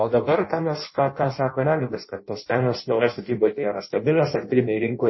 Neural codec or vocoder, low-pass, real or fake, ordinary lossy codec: codec, 16 kHz in and 24 kHz out, 0.6 kbps, FireRedTTS-2 codec; 7.2 kHz; fake; MP3, 24 kbps